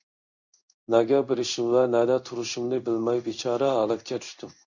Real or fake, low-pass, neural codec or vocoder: fake; 7.2 kHz; codec, 16 kHz in and 24 kHz out, 1 kbps, XY-Tokenizer